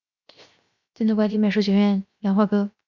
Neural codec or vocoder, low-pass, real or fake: codec, 16 kHz, 0.7 kbps, FocalCodec; 7.2 kHz; fake